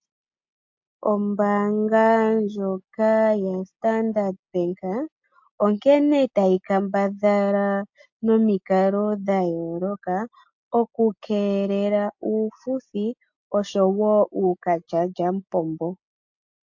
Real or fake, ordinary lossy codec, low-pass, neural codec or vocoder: real; MP3, 48 kbps; 7.2 kHz; none